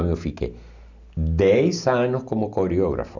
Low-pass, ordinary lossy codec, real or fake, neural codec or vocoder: 7.2 kHz; none; real; none